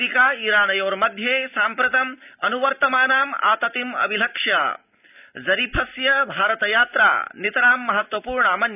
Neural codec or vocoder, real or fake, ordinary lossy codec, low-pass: none; real; none; 3.6 kHz